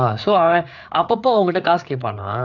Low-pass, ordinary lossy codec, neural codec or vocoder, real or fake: 7.2 kHz; none; codec, 16 kHz, 4 kbps, FreqCodec, larger model; fake